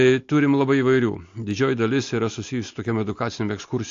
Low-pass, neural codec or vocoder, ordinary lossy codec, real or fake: 7.2 kHz; none; AAC, 48 kbps; real